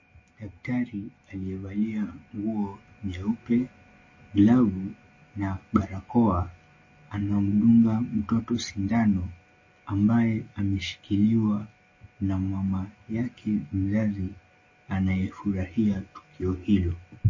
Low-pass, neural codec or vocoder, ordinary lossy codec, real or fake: 7.2 kHz; none; MP3, 32 kbps; real